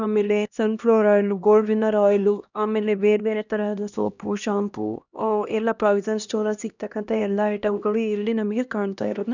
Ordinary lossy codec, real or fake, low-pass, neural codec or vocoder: none; fake; 7.2 kHz; codec, 16 kHz, 1 kbps, X-Codec, HuBERT features, trained on LibriSpeech